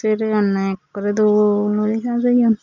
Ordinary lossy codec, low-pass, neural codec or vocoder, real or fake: none; 7.2 kHz; none; real